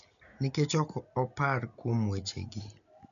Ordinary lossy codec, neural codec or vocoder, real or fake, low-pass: none; none; real; 7.2 kHz